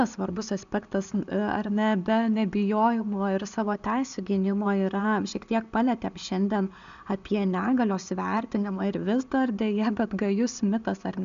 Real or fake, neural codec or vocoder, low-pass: real; none; 7.2 kHz